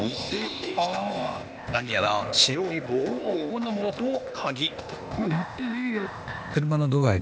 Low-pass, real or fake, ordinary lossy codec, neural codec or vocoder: none; fake; none; codec, 16 kHz, 0.8 kbps, ZipCodec